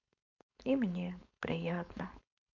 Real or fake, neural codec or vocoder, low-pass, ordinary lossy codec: fake; codec, 16 kHz, 4.8 kbps, FACodec; 7.2 kHz; none